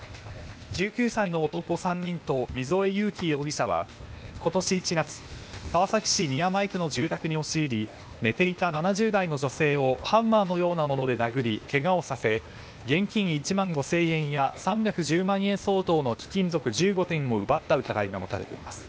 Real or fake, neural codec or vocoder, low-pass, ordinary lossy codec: fake; codec, 16 kHz, 0.8 kbps, ZipCodec; none; none